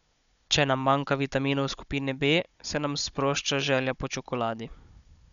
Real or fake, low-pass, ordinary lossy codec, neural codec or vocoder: fake; 7.2 kHz; none; codec, 16 kHz, 16 kbps, FunCodec, trained on Chinese and English, 50 frames a second